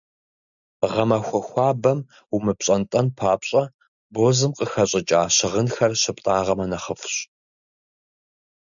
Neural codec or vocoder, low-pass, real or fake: none; 7.2 kHz; real